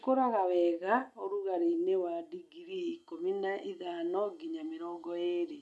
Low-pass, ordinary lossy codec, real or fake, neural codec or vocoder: none; none; real; none